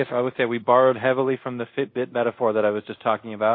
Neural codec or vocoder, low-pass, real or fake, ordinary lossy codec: codec, 24 kHz, 0.5 kbps, DualCodec; 5.4 kHz; fake; MP3, 32 kbps